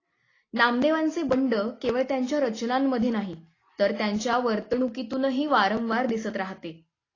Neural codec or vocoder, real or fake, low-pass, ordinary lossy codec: none; real; 7.2 kHz; AAC, 32 kbps